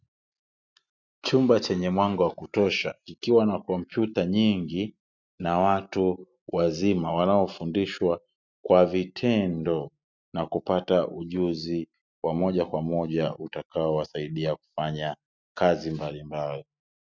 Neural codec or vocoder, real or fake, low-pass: none; real; 7.2 kHz